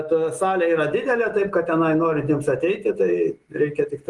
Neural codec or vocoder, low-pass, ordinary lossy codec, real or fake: none; 10.8 kHz; Opus, 24 kbps; real